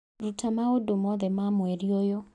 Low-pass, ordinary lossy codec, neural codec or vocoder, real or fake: 10.8 kHz; none; none; real